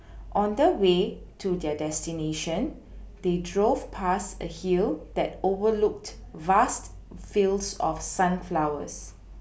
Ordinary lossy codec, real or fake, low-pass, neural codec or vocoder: none; real; none; none